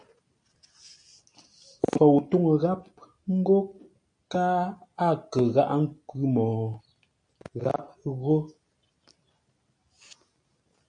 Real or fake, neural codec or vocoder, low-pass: real; none; 9.9 kHz